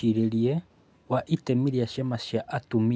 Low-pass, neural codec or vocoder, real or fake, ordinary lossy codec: none; none; real; none